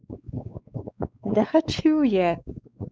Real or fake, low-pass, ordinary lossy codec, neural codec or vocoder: fake; none; none; codec, 16 kHz, 4 kbps, X-Codec, WavLM features, trained on Multilingual LibriSpeech